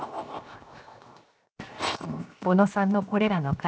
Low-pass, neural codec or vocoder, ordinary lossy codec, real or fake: none; codec, 16 kHz, 0.7 kbps, FocalCodec; none; fake